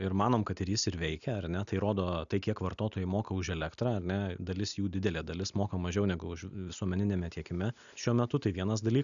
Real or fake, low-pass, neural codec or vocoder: real; 7.2 kHz; none